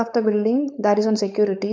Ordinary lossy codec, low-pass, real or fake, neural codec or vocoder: none; none; fake; codec, 16 kHz, 4.8 kbps, FACodec